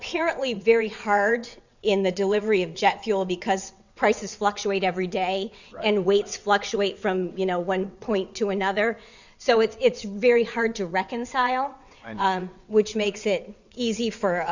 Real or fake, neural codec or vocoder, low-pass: fake; vocoder, 22.05 kHz, 80 mel bands, WaveNeXt; 7.2 kHz